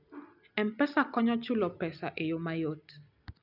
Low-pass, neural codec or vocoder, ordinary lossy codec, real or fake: 5.4 kHz; none; none; real